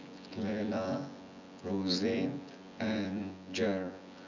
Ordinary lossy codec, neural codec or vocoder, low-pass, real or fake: none; vocoder, 24 kHz, 100 mel bands, Vocos; 7.2 kHz; fake